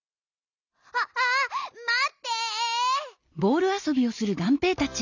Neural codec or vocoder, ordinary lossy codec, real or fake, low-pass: none; none; real; 7.2 kHz